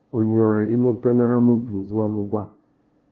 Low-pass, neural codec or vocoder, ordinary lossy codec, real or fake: 7.2 kHz; codec, 16 kHz, 0.5 kbps, FunCodec, trained on LibriTTS, 25 frames a second; Opus, 16 kbps; fake